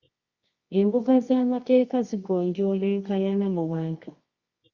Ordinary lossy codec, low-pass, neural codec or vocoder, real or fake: Opus, 64 kbps; 7.2 kHz; codec, 24 kHz, 0.9 kbps, WavTokenizer, medium music audio release; fake